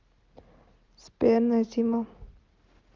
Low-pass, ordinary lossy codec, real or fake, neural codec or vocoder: 7.2 kHz; Opus, 32 kbps; real; none